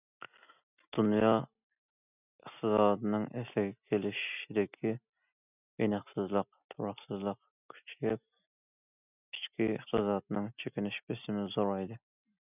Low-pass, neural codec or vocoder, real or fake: 3.6 kHz; none; real